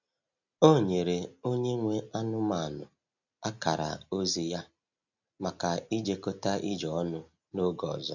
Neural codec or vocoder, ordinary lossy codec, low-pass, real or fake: none; none; 7.2 kHz; real